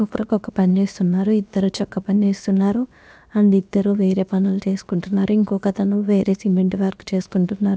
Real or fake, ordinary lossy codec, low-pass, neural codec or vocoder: fake; none; none; codec, 16 kHz, about 1 kbps, DyCAST, with the encoder's durations